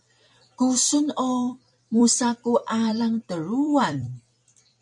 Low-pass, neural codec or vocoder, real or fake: 10.8 kHz; vocoder, 44.1 kHz, 128 mel bands every 256 samples, BigVGAN v2; fake